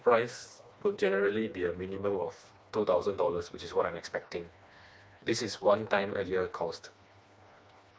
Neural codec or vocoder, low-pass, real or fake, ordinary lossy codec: codec, 16 kHz, 2 kbps, FreqCodec, smaller model; none; fake; none